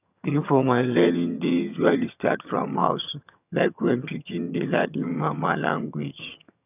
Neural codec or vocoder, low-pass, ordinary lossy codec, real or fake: vocoder, 22.05 kHz, 80 mel bands, HiFi-GAN; 3.6 kHz; none; fake